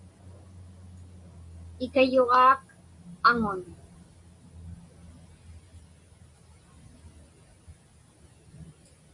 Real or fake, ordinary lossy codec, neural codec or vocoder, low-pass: real; MP3, 48 kbps; none; 10.8 kHz